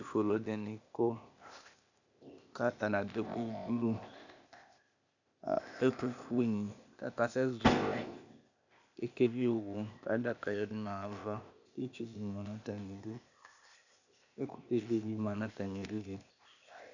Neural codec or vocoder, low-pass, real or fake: codec, 16 kHz, 0.8 kbps, ZipCodec; 7.2 kHz; fake